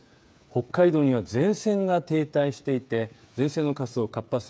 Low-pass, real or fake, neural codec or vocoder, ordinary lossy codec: none; fake; codec, 16 kHz, 8 kbps, FreqCodec, smaller model; none